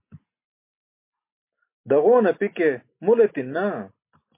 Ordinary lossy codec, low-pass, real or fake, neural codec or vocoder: MP3, 24 kbps; 3.6 kHz; real; none